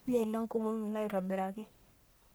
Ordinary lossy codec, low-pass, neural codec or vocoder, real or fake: none; none; codec, 44.1 kHz, 1.7 kbps, Pupu-Codec; fake